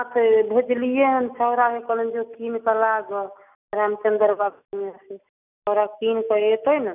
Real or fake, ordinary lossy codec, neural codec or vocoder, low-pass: real; none; none; 3.6 kHz